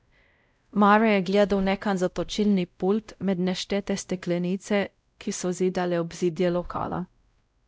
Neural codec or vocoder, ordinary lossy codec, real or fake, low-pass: codec, 16 kHz, 0.5 kbps, X-Codec, WavLM features, trained on Multilingual LibriSpeech; none; fake; none